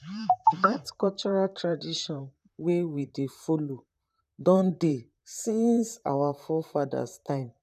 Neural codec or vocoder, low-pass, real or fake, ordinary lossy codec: vocoder, 44.1 kHz, 128 mel bands, Pupu-Vocoder; 14.4 kHz; fake; none